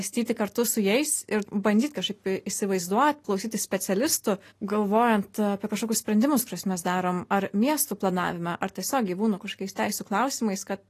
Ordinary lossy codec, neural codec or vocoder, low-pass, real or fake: AAC, 48 kbps; none; 14.4 kHz; real